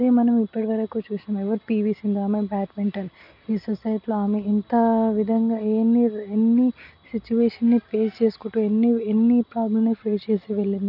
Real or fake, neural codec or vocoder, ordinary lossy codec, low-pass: real; none; none; 5.4 kHz